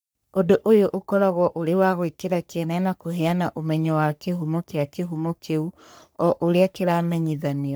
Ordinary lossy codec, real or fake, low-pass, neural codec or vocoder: none; fake; none; codec, 44.1 kHz, 3.4 kbps, Pupu-Codec